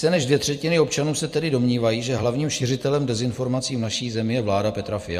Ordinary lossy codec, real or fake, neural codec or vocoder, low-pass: MP3, 64 kbps; fake; vocoder, 44.1 kHz, 128 mel bands every 256 samples, BigVGAN v2; 14.4 kHz